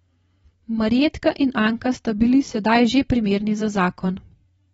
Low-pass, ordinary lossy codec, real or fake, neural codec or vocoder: 19.8 kHz; AAC, 24 kbps; fake; vocoder, 44.1 kHz, 128 mel bands every 512 samples, BigVGAN v2